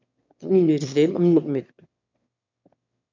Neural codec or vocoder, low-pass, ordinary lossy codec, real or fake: autoencoder, 22.05 kHz, a latent of 192 numbers a frame, VITS, trained on one speaker; 7.2 kHz; MP3, 64 kbps; fake